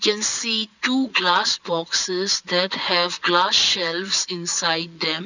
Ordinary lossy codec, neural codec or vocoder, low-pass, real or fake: none; vocoder, 22.05 kHz, 80 mel bands, Vocos; 7.2 kHz; fake